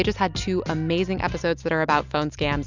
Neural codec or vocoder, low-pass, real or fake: none; 7.2 kHz; real